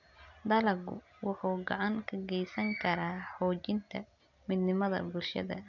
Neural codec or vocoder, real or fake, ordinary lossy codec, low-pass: none; real; none; 7.2 kHz